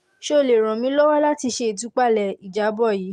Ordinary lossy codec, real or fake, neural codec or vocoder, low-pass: Opus, 32 kbps; real; none; 14.4 kHz